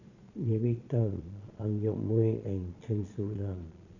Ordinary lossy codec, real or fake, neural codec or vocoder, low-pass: none; fake; vocoder, 22.05 kHz, 80 mel bands, Vocos; 7.2 kHz